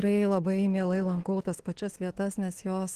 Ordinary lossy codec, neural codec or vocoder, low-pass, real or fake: Opus, 16 kbps; autoencoder, 48 kHz, 32 numbers a frame, DAC-VAE, trained on Japanese speech; 14.4 kHz; fake